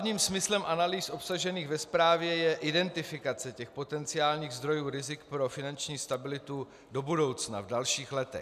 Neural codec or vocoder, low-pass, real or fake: none; 14.4 kHz; real